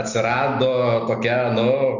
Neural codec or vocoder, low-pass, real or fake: none; 7.2 kHz; real